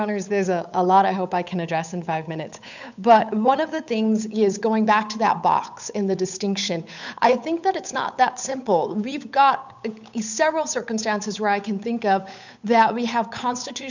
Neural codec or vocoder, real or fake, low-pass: codec, 16 kHz, 8 kbps, FunCodec, trained on Chinese and English, 25 frames a second; fake; 7.2 kHz